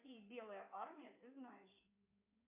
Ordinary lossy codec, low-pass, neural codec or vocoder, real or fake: MP3, 32 kbps; 3.6 kHz; codec, 16 kHz in and 24 kHz out, 2.2 kbps, FireRedTTS-2 codec; fake